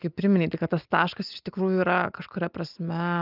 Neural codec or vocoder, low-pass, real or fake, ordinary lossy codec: none; 5.4 kHz; real; Opus, 32 kbps